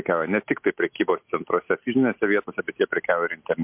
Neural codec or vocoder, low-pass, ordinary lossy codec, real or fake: none; 3.6 kHz; MP3, 32 kbps; real